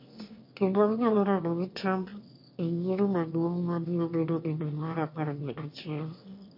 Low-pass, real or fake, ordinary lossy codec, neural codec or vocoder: 5.4 kHz; fake; MP3, 32 kbps; autoencoder, 22.05 kHz, a latent of 192 numbers a frame, VITS, trained on one speaker